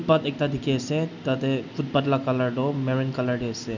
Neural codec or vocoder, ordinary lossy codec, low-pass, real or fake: none; none; 7.2 kHz; real